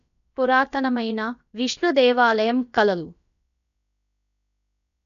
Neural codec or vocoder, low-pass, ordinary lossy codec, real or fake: codec, 16 kHz, about 1 kbps, DyCAST, with the encoder's durations; 7.2 kHz; none; fake